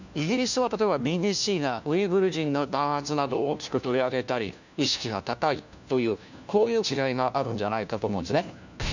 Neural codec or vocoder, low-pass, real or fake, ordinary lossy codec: codec, 16 kHz, 1 kbps, FunCodec, trained on LibriTTS, 50 frames a second; 7.2 kHz; fake; none